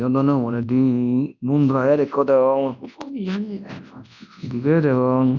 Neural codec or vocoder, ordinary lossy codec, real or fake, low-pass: codec, 24 kHz, 0.9 kbps, WavTokenizer, large speech release; none; fake; 7.2 kHz